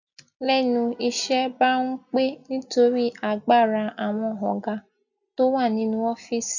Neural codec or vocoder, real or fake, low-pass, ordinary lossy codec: none; real; 7.2 kHz; none